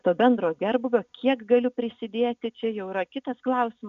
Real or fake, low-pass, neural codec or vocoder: real; 7.2 kHz; none